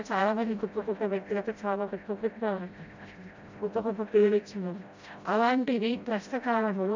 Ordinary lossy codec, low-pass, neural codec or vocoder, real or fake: MP3, 64 kbps; 7.2 kHz; codec, 16 kHz, 0.5 kbps, FreqCodec, smaller model; fake